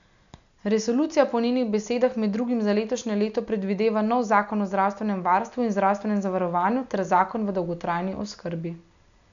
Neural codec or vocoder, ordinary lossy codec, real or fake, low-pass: none; none; real; 7.2 kHz